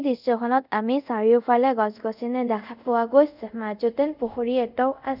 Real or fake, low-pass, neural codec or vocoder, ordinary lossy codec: fake; 5.4 kHz; codec, 24 kHz, 0.5 kbps, DualCodec; none